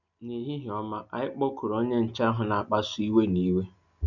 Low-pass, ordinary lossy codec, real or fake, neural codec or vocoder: 7.2 kHz; none; real; none